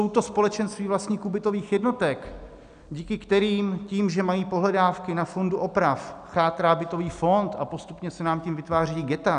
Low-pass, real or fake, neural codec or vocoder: 9.9 kHz; real; none